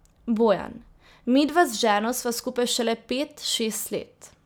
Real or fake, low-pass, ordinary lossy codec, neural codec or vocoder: real; none; none; none